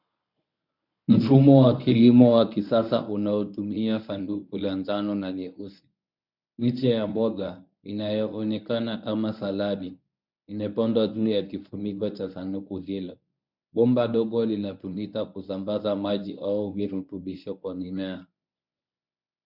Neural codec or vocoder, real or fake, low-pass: codec, 24 kHz, 0.9 kbps, WavTokenizer, medium speech release version 1; fake; 5.4 kHz